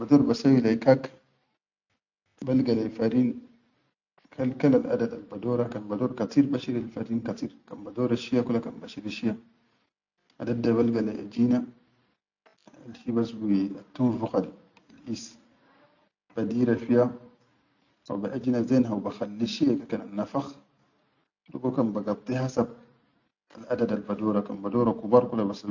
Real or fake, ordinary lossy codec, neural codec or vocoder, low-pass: real; none; none; 7.2 kHz